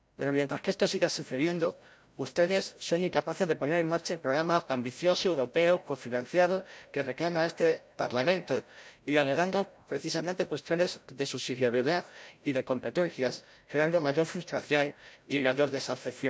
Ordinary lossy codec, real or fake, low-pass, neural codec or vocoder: none; fake; none; codec, 16 kHz, 0.5 kbps, FreqCodec, larger model